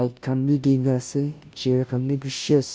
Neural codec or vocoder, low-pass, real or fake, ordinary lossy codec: codec, 16 kHz, 0.5 kbps, FunCodec, trained on Chinese and English, 25 frames a second; none; fake; none